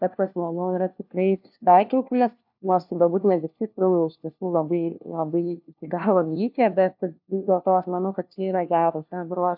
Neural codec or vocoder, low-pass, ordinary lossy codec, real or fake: codec, 16 kHz, 1 kbps, FunCodec, trained on LibriTTS, 50 frames a second; 5.4 kHz; AAC, 48 kbps; fake